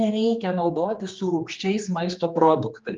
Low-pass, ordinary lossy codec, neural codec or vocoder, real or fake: 7.2 kHz; Opus, 24 kbps; codec, 16 kHz, 2 kbps, X-Codec, HuBERT features, trained on general audio; fake